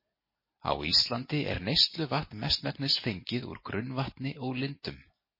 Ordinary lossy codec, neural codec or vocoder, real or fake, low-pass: MP3, 24 kbps; none; real; 5.4 kHz